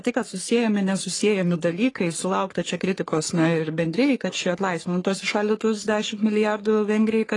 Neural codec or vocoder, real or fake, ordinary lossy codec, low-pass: codec, 44.1 kHz, 3.4 kbps, Pupu-Codec; fake; AAC, 32 kbps; 10.8 kHz